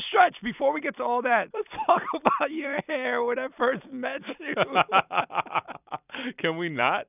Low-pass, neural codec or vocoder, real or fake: 3.6 kHz; vocoder, 44.1 kHz, 128 mel bands every 256 samples, BigVGAN v2; fake